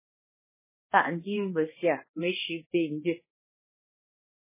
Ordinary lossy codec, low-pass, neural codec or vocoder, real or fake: MP3, 16 kbps; 3.6 kHz; codec, 16 kHz, 1 kbps, X-Codec, HuBERT features, trained on balanced general audio; fake